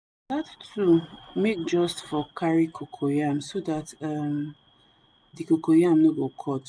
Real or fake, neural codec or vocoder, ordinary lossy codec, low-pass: real; none; none; none